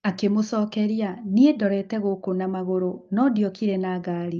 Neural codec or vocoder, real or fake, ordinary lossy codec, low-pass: none; real; Opus, 24 kbps; 7.2 kHz